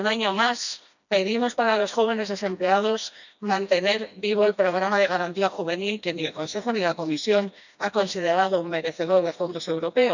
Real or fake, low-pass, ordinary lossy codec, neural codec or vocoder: fake; 7.2 kHz; none; codec, 16 kHz, 1 kbps, FreqCodec, smaller model